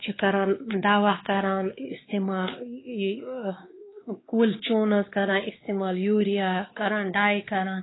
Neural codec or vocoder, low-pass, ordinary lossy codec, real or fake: codec, 16 kHz, 2 kbps, X-Codec, WavLM features, trained on Multilingual LibriSpeech; 7.2 kHz; AAC, 16 kbps; fake